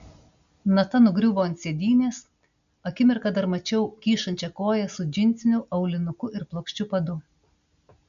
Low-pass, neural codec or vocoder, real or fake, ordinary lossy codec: 7.2 kHz; none; real; AAC, 96 kbps